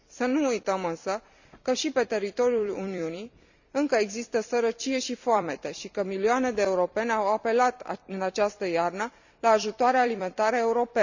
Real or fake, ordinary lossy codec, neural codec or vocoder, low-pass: fake; none; vocoder, 44.1 kHz, 128 mel bands every 256 samples, BigVGAN v2; 7.2 kHz